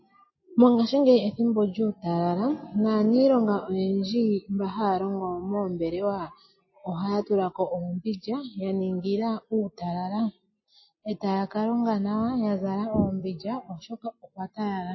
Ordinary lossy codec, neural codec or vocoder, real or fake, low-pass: MP3, 24 kbps; none; real; 7.2 kHz